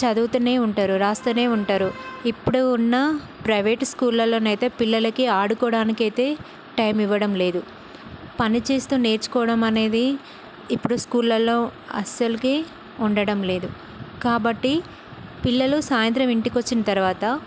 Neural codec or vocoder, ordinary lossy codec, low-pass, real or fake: none; none; none; real